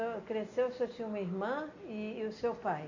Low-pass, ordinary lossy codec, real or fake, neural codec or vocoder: 7.2 kHz; none; real; none